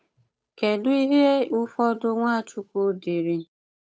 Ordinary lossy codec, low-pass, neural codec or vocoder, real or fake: none; none; codec, 16 kHz, 8 kbps, FunCodec, trained on Chinese and English, 25 frames a second; fake